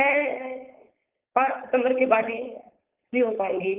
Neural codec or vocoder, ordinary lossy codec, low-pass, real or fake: codec, 16 kHz, 4.8 kbps, FACodec; Opus, 32 kbps; 3.6 kHz; fake